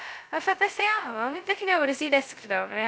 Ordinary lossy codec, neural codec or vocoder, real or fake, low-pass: none; codec, 16 kHz, 0.2 kbps, FocalCodec; fake; none